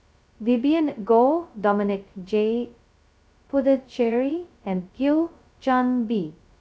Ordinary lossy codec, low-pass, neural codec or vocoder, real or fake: none; none; codec, 16 kHz, 0.2 kbps, FocalCodec; fake